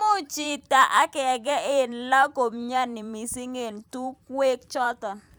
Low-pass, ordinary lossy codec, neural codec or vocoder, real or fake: none; none; vocoder, 44.1 kHz, 128 mel bands every 512 samples, BigVGAN v2; fake